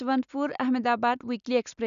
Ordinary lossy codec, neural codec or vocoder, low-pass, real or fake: none; none; 7.2 kHz; real